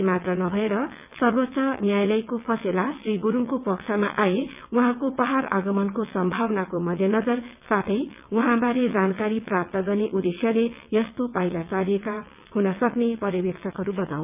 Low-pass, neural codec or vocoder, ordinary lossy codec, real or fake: 3.6 kHz; vocoder, 22.05 kHz, 80 mel bands, WaveNeXt; none; fake